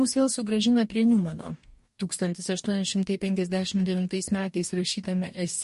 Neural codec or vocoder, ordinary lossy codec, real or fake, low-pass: codec, 44.1 kHz, 2.6 kbps, DAC; MP3, 48 kbps; fake; 14.4 kHz